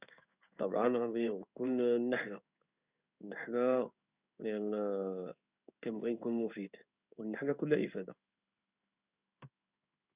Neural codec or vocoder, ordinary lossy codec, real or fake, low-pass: codec, 16 kHz in and 24 kHz out, 1 kbps, XY-Tokenizer; Opus, 64 kbps; fake; 3.6 kHz